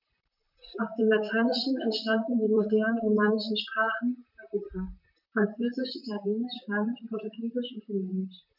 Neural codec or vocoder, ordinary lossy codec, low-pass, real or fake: vocoder, 44.1 kHz, 128 mel bands, Pupu-Vocoder; none; 5.4 kHz; fake